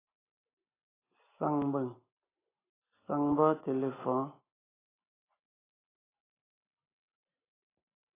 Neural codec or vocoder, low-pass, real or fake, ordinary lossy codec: none; 3.6 kHz; real; AAC, 16 kbps